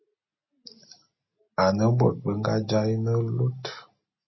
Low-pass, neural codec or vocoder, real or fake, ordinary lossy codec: 7.2 kHz; none; real; MP3, 24 kbps